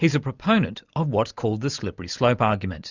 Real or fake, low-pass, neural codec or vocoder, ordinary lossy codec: real; 7.2 kHz; none; Opus, 64 kbps